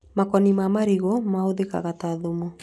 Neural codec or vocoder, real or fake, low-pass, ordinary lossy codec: none; real; none; none